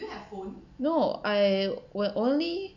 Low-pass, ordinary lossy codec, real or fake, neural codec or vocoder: 7.2 kHz; none; real; none